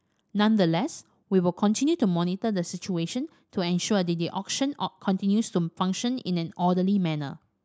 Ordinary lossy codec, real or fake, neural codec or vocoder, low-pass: none; real; none; none